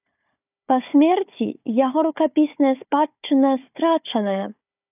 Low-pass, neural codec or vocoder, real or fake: 3.6 kHz; codec, 16 kHz, 4 kbps, FunCodec, trained on Chinese and English, 50 frames a second; fake